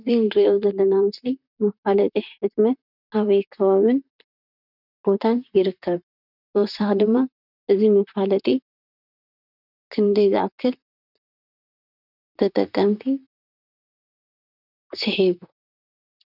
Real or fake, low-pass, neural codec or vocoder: fake; 5.4 kHz; vocoder, 44.1 kHz, 128 mel bands, Pupu-Vocoder